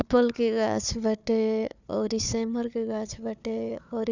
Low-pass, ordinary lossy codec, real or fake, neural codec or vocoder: 7.2 kHz; none; fake; codec, 16 kHz, 4 kbps, FunCodec, trained on Chinese and English, 50 frames a second